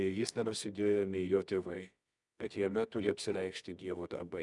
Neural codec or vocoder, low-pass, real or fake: codec, 24 kHz, 0.9 kbps, WavTokenizer, medium music audio release; 10.8 kHz; fake